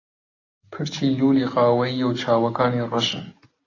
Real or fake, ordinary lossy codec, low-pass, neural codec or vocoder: real; AAC, 32 kbps; 7.2 kHz; none